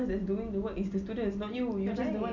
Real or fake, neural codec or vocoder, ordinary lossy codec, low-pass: real; none; none; 7.2 kHz